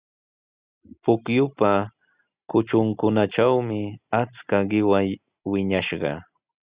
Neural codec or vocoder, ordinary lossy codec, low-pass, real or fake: none; Opus, 64 kbps; 3.6 kHz; real